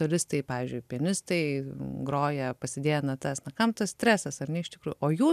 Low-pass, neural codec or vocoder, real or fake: 14.4 kHz; none; real